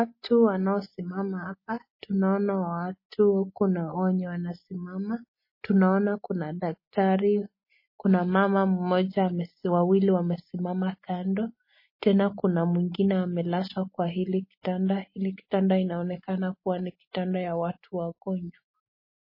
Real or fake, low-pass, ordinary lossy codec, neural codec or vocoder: real; 5.4 kHz; MP3, 24 kbps; none